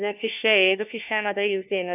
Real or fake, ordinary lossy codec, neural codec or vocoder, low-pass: fake; none; codec, 16 kHz, 1 kbps, FunCodec, trained on LibriTTS, 50 frames a second; 3.6 kHz